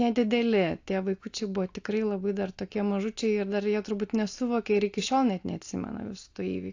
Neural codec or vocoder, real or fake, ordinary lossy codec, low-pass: none; real; AAC, 48 kbps; 7.2 kHz